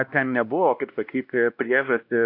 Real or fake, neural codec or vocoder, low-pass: fake; codec, 16 kHz, 1 kbps, X-Codec, WavLM features, trained on Multilingual LibriSpeech; 5.4 kHz